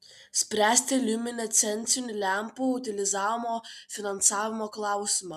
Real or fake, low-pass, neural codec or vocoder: real; 14.4 kHz; none